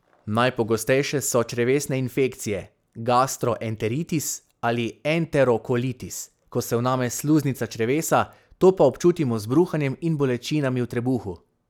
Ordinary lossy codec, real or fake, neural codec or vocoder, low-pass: none; real; none; none